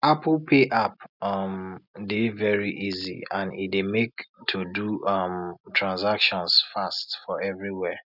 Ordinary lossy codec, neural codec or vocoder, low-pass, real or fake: none; none; 5.4 kHz; real